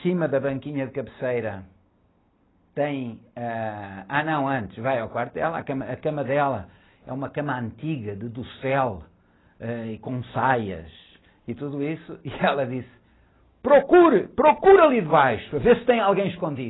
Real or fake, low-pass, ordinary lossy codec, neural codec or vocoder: real; 7.2 kHz; AAC, 16 kbps; none